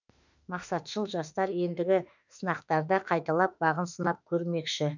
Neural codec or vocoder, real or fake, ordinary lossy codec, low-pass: autoencoder, 48 kHz, 32 numbers a frame, DAC-VAE, trained on Japanese speech; fake; none; 7.2 kHz